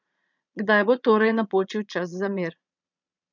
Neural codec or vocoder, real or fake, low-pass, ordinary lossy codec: vocoder, 22.05 kHz, 80 mel bands, Vocos; fake; 7.2 kHz; none